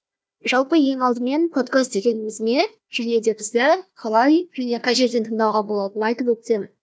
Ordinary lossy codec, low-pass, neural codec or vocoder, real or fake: none; none; codec, 16 kHz, 1 kbps, FunCodec, trained on Chinese and English, 50 frames a second; fake